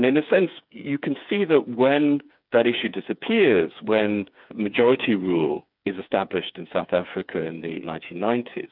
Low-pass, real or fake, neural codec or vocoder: 5.4 kHz; fake; codec, 16 kHz, 4 kbps, FreqCodec, smaller model